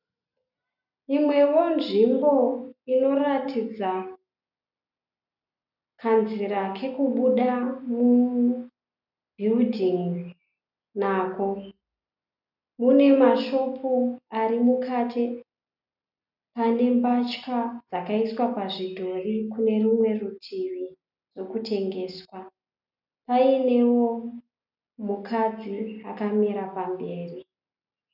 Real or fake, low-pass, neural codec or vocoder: real; 5.4 kHz; none